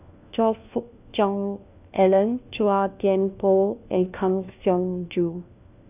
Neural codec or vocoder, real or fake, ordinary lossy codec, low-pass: codec, 16 kHz, 1 kbps, FunCodec, trained on LibriTTS, 50 frames a second; fake; none; 3.6 kHz